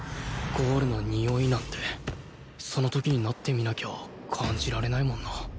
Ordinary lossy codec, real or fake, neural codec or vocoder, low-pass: none; real; none; none